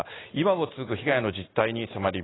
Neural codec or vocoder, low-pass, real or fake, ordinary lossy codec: none; 7.2 kHz; real; AAC, 16 kbps